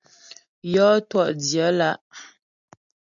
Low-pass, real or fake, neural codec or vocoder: 7.2 kHz; real; none